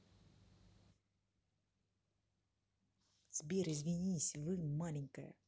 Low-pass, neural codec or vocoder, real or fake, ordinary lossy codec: none; none; real; none